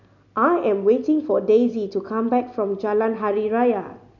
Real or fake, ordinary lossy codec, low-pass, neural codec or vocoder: real; none; 7.2 kHz; none